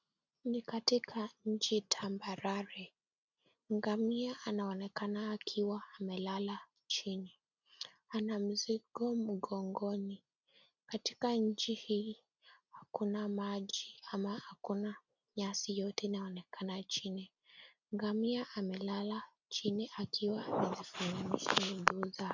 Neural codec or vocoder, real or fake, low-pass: none; real; 7.2 kHz